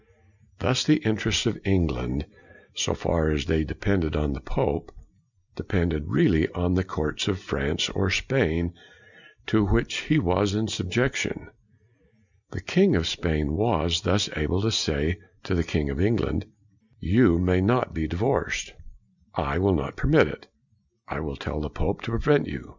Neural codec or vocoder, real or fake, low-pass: none; real; 7.2 kHz